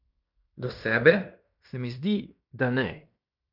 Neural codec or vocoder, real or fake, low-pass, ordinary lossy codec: codec, 16 kHz in and 24 kHz out, 0.9 kbps, LongCat-Audio-Codec, fine tuned four codebook decoder; fake; 5.4 kHz; none